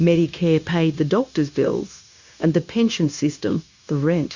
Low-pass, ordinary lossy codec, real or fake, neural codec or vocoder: 7.2 kHz; Opus, 64 kbps; fake; codec, 16 kHz, 0.9 kbps, LongCat-Audio-Codec